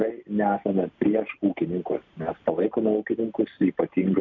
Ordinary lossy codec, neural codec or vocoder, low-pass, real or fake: MP3, 64 kbps; none; 7.2 kHz; real